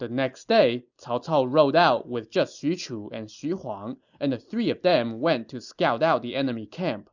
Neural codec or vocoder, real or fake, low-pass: none; real; 7.2 kHz